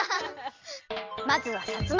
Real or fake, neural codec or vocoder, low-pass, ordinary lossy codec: real; none; 7.2 kHz; Opus, 32 kbps